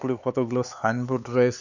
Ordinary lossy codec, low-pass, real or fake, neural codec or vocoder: none; 7.2 kHz; fake; codec, 16 kHz, 4 kbps, X-Codec, HuBERT features, trained on LibriSpeech